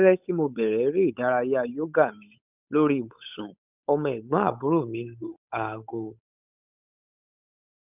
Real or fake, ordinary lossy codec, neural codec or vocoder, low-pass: fake; none; codec, 16 kHz, 8 kbps, FunCodec, trained on Chinese and English, 25 frames a second; 3.6 kHz